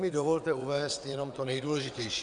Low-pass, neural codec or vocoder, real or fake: 9.9 kHz; vocoder, 22.05 kHz, 80 mel bands, WaveNeXt; fake